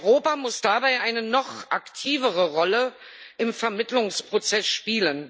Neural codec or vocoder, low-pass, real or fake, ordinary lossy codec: none; none; real; none